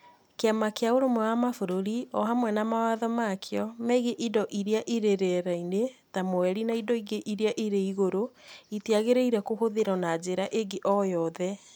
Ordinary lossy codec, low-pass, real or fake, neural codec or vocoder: none; none; real; none